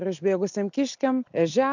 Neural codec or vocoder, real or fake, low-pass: none; real; 7.2 kHz